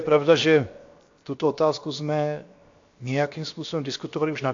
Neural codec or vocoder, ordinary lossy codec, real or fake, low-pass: codec, 16 kHz, 0.7 kbps, FocalCodec; AAC, 48 kbps; fake; 7.2 kHz